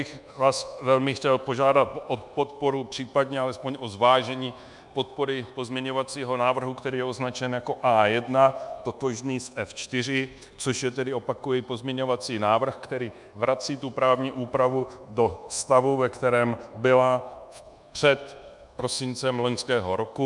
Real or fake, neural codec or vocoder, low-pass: fake; codec, 24 kHz, 1.2 kbps, DualCodec; 10.8 kHz